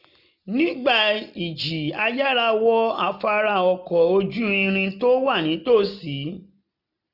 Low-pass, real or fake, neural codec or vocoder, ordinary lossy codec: 5.4 kHz; real; none; none